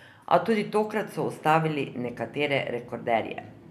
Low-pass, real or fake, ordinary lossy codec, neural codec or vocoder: 14.4 kHz; real; none; none